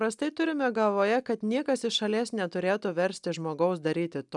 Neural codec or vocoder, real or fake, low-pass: none; real; 10.8 kHz